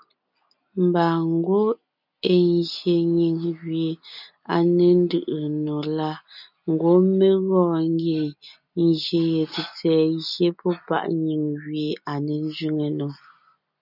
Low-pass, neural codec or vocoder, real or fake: 5.4 kHz; none; real